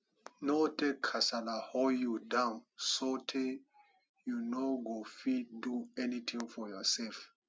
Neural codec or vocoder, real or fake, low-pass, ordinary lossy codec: none; real; none; none